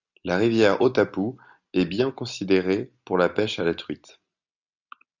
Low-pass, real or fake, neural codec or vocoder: 7.2 kHz; real; none